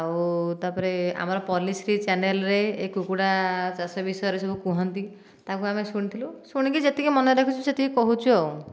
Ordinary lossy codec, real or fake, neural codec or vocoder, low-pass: none; real; none; none